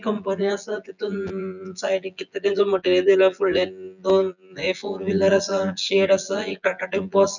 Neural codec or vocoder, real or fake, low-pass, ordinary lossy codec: vocoder, 24 kHz, 100 mel bands, Vocos; fake; 7.2 kHz; none